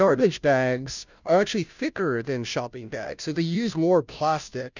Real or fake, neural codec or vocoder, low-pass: fake; codec, 16 kHz, 0.5 kbps, FunCodec, trained on Chinese and English, 25 frames a second; 7.2 kHz